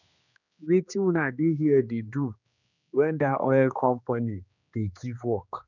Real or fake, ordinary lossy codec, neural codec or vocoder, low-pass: fake; none; codec, 16 kHz, 2 kbps, X-Codec, HuBERT features, trained on general audio; 7.2 kHz